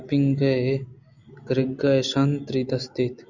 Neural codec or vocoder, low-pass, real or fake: none; 7.2 kHz; real